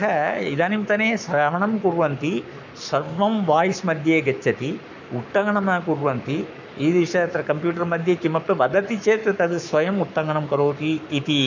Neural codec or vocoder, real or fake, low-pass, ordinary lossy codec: codec, 44.1 kHz, 7.8 kbps, Pupu-Codec; fake; 7.2 kHz; none